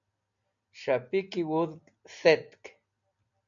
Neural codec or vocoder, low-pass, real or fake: none; 7.2 kHz; real